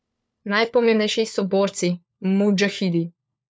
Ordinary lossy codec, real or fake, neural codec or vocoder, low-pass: none; fake; codec, 16 kHz, 4 kbps, FunCodec, trained on LibriTTS, 50 frames a second; none